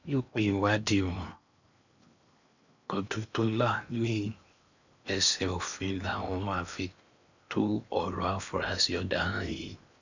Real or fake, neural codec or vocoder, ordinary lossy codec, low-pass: fake; codec, 16 kHz in and 24 kHz out, 0.8 kbps, FocalCodec, streaming, 65536 codes; none; 7.2 kHz